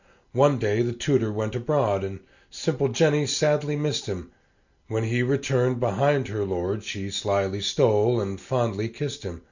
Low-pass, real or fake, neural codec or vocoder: 7.2 kHz; real; none